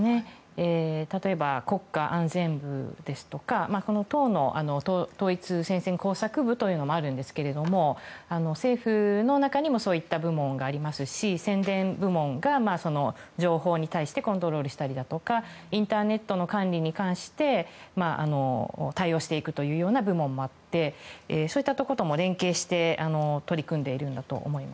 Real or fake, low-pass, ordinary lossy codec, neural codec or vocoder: real; none; none; none